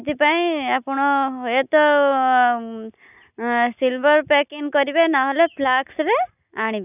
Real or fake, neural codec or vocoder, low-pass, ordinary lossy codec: real; none; 3.6 kHz; none